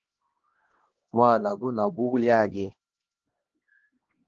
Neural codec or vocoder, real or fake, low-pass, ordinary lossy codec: codec, 16 kHz, 1 kbps, X-Codec, HuBERT features, trained on LibriSpeech; fake; 7.2 kHz; Opus, 16 kbps